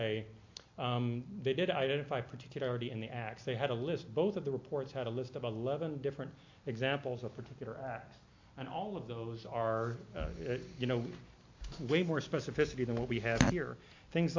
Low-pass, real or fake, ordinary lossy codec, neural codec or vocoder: 7.2 kHz; real; MP3, 48 kbps; none